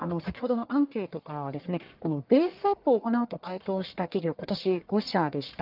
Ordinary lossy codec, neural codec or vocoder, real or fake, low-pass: Opus, 32 kbps; codec, 44.1 kHz, 1.7 kbps, Pupu-Codec; fake; 5.4 kHz